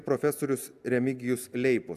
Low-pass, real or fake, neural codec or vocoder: 14.4 kHz; real; none